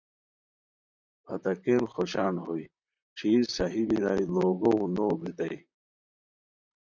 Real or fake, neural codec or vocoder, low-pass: fake; vocoder, 44.1 kHz, 128 mel bands, Pupu-Vocoder; 7.2 kHz